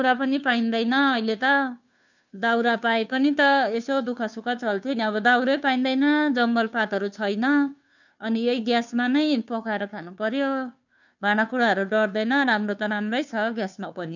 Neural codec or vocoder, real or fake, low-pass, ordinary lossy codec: codec, 16 kHz, 2 kbps, FunCodec, trained on Chinese and English, 25 frames a second; fake; 7.2 kHz; none